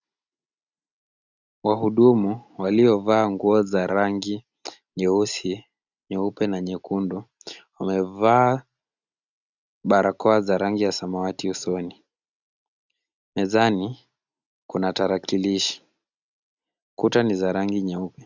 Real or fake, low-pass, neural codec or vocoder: real; 7.2 kHz; none